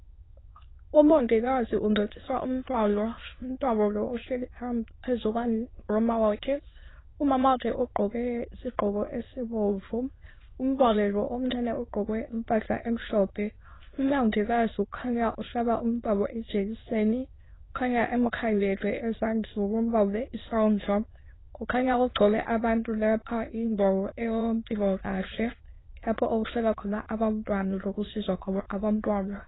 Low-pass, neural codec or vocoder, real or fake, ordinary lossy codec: 7.2 kHz; autoencoder, 22.05 kHz, a latent of 192 numbers a frame, VITS, trained on many speakers; fake; AAC, 16 kbps